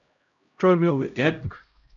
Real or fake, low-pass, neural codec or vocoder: fake; 7.2 kHz; codec, 16 kHz, 0.5 kbps, X-Codec, HuBERT features, trained on LibriSpeech